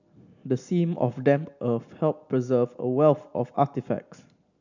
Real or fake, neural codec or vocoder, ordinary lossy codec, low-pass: real; none; none; 7.2 kHz